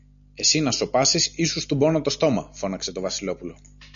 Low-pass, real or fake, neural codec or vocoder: 7.2 kHz; real; none